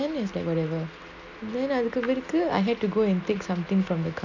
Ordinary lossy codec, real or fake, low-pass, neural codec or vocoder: none; real; 7.2 kHz; none